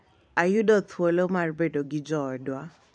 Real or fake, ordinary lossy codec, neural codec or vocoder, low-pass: fake; none; vocoder, 44.1 kHz, 128 mel bands every 512 samples, BigVGAN v2; 9.9 kHz